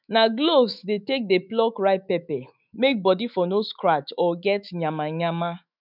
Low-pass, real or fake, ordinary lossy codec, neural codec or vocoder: 5.4 kHz; fake; none; autoencoder, 48 kHz, 128 numbers a frame, DAC-VAE, trained on Japanese speech